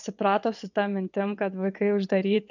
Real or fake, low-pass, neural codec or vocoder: fake; 7.2 kHz; vocoder, 22.05 kHz, 80 mel bands, WaveNeXt